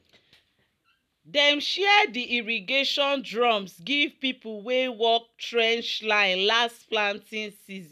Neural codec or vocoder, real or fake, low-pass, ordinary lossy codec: none; real; 14.4 kHz; none